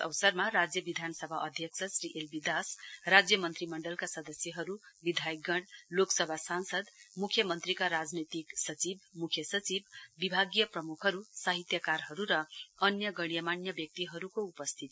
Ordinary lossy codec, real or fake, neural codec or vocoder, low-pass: none; real; none; none